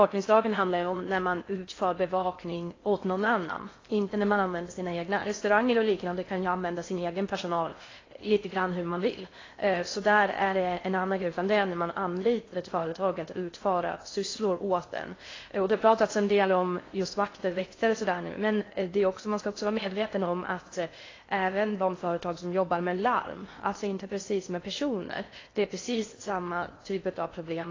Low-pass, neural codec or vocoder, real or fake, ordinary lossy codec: 7.2 kHz; codec, 16 kHz in and 24 kHz out, 0.6 kbps, FocalCodec, streaming, 4096 codes; fake; AAC, 32 kbps